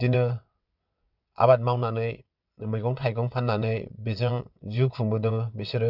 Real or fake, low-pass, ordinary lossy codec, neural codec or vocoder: fake; 5.4 kHz; none; vocoder, 22.05 kHz, 80 mel bands, WaveNeXt